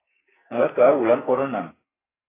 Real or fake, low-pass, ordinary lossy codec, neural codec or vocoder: fake; 3.6 kHz; AAC, 16 kbps; codec, 44.1 kHz, 2.6 kbps, SNAC